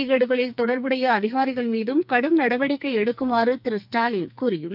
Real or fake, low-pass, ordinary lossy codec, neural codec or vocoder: fake; 5.4 kHz; none; codec, 44.1 kHz, 2.6 kbps, SNAC